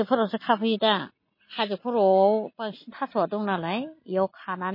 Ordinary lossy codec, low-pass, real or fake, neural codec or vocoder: MP3, 24 kbps; 5.4 kHz; real; none